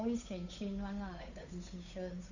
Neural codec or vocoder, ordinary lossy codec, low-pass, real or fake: codec, 16 kHz, 8 kbps, FunCodec, trained on Chinese and English, 25 frames a second; none; 7.2 kHz; fake